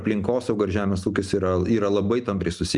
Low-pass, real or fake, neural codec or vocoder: 10.8 kHz; real; none